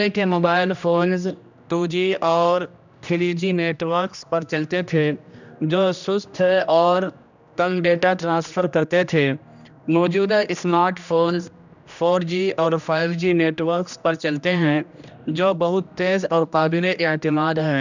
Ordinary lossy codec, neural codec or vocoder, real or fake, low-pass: none; codec, 16 kHz, 1 kbps, X-Codec, HuBERT features, trained on general audio; fake; 7.2 kHz